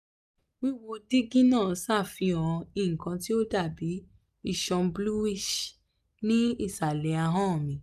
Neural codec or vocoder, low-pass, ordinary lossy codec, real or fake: none; 14.4 kHz; none; real